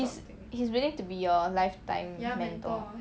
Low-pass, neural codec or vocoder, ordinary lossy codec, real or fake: none; none; none; real